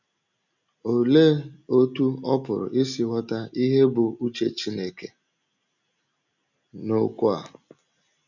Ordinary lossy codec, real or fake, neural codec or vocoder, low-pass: none; real; none; 7.2 kHz